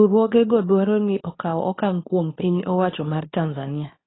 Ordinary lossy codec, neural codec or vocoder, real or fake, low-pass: AAC, 16 kbps; codec, 24 kHz, 0.9 kbps, WavTokenizer, medium speech release version 2; fake; 7.2 kHz